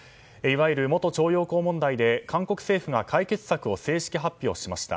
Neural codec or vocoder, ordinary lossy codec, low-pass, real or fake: none; none; none; real